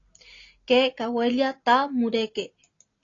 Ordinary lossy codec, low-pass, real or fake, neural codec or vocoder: AAC, 32 kbps; 7.2 kHz; real; none